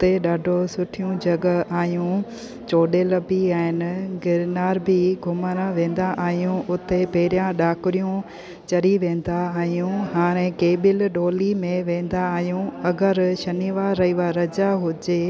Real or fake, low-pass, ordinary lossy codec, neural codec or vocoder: real; none; none; none